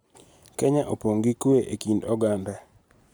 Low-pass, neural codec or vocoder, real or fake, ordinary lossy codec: none; none; real; none